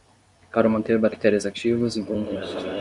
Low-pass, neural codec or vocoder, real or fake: 10.8 kHz; codec, 24 kHz, 0.9 kbps, WavTokenizer, medium speech release version 2; fake